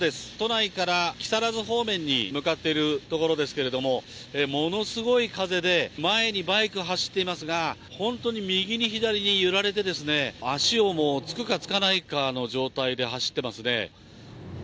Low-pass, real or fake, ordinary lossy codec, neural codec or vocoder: none; real; none; none